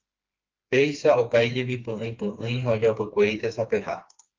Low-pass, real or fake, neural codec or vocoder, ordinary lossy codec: 7.2 kHz; fake; codec, 16 kHz, 2 kbps, FreqCodec, smaller model; Opus, 24 kbps